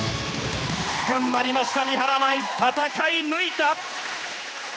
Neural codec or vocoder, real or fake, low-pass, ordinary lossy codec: codec, 16 kHz, 2 kbps, X-Codec, HuBERT features, trained on general audio; fake; none; none